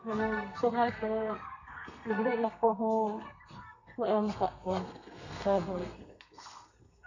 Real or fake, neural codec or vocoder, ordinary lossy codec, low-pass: fake; codec, 32 kHz, 1.9 kbps, SNAC; none; 7.2 kHz